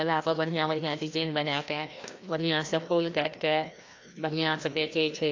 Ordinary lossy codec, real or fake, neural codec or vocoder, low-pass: AAC, 48 kbps; fake; codec, 16 kHz, 1 kbps, FreqCodec, larger model; 7.2 kHz